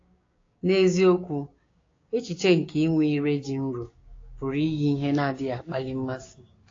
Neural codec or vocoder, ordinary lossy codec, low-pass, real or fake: codec, 16 kHz, 6 kbps, DAC; AAC, 32 kbps; 7.2 kHz; fake